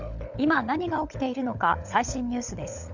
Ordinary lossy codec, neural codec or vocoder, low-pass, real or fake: none; codec, 16 kHz, 16 kbps, FunCodec, trained on LibriTTS, 50 frames a second; 7.2 kHz; fake